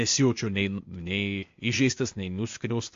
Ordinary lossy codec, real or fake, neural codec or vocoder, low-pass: MP3, 48 kbps; fake; codec, 16 kHz, 0.8 kbps, ZipCodec; 7.2 kHz